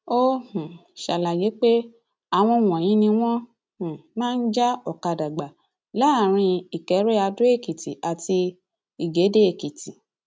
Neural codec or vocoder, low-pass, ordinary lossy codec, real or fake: none; none; none; real